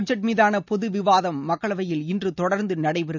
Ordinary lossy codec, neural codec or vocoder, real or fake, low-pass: none; none; real; 7.2 kHz